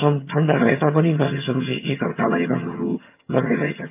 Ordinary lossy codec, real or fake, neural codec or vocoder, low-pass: AAC, 32 kbps; fake; vocoder, 22.05 kHz, 80 mel bands, HiFi-GAN; 3.6 kHz